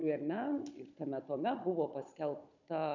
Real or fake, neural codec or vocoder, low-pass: fake; codec, 16 kHz, 16 kbps, FunCodec, trained on Chinese and English, 50 frames a second; 7.2 kHz